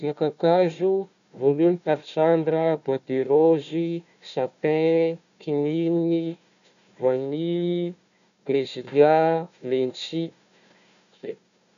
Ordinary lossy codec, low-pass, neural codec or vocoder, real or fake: none; 7.2 kHz; codec, 16 kHz, 1 kbps, FunCodec, trained on Chinese and English, 50 frames a second; fake